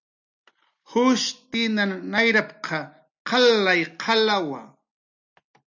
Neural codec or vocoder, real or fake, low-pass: none; real; 7.2 kHz